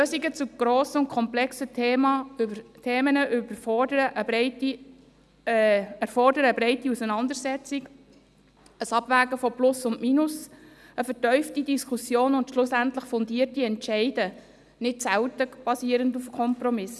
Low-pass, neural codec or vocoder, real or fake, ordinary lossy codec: none; none; real; none